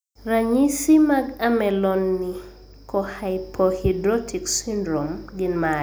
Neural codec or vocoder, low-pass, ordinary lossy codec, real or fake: none; none; none; real